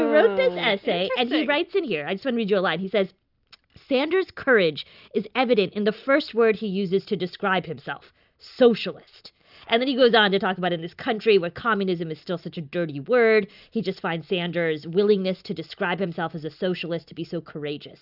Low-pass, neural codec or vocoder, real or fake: 5.4 kHz; none; real